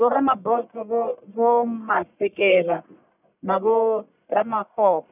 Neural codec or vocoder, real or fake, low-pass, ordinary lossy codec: codec, 44.1 kHz, 1.7 kbps, Pupu-Codec; fake; 3.6 kHz; none